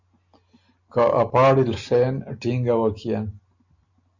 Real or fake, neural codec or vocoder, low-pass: real; none; 7.2 kHz